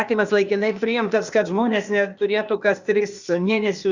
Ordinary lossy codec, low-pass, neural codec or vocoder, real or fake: Opus, 64 kbps; 7.2 kHz; codec, 16 kHz, 0.8 kbps, ZipCodec; fake